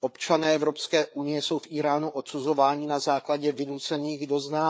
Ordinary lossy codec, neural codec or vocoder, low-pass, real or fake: none; codec, 16 kHz, 4 kbps, FreqCodec, larger model; none; fake